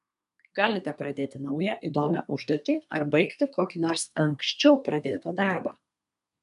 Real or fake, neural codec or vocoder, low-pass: fake; codec, 24 kHz, 1 kbps, SNAC; 10.8 kHz